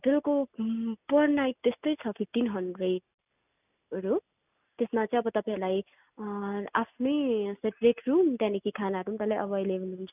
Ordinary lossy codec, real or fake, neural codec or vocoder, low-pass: none; real; none; 3.6 kHz